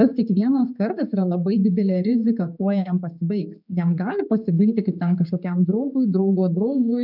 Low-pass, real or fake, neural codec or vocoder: 5.4 kHz; fake; codec, 16 kHz, 4 kbps, X-Codec, HuBERT features, trained on general audio